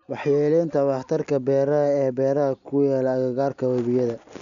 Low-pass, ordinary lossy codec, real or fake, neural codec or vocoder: 7.2 kHz; none; real; none